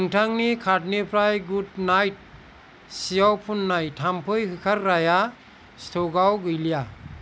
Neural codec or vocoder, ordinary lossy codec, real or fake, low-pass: none; none; real; none